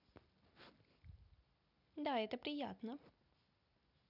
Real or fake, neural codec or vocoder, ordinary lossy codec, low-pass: real; none; none; 5.4 kHz